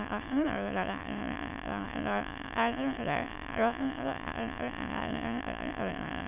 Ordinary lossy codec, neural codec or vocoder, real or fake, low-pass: none; autoencoder, 22.05 kHz, a latent of 192 numbers a frame, VITS, trained on many speakers; fake; 3.6 kHz